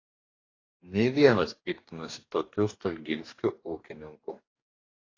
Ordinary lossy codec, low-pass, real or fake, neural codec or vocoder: AAC, 32 kbps; 7.2 kHz; fake; codec, 44.1 kHz, 2.6 kbps, DAC